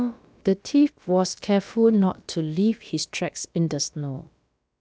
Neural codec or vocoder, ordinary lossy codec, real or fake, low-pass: codec, 16 kHz, about 1 kbps, DyCAST, with the encoder's durations; none; fake; none